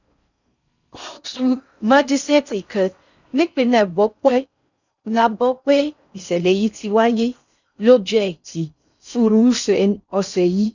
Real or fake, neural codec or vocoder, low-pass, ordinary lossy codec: fake; codec, 16 kHz in and 24 kHz out, 0.6 kbps, FocalCodec, streaming, 4096 codes; 7.2 kHz; none